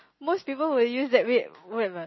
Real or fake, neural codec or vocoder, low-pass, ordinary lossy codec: real; none; 7.2 kHz; MP3, 24 kbps